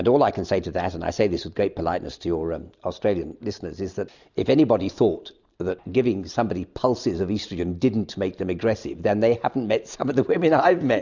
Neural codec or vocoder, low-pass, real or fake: none; 7.2 kHz; real